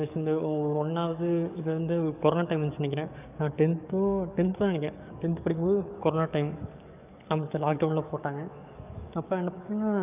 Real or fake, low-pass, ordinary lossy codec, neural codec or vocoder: fake; 3.6 kHz; none; codec, 44.1 kHz, 7.8 kbps, DAC